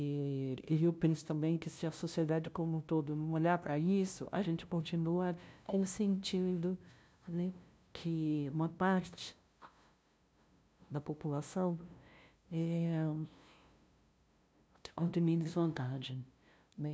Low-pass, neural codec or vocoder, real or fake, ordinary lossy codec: none; codec, 16 kHz, 0.5 kbps, FunCodec, trained on LibriTTS, 25 frames a second; fake; none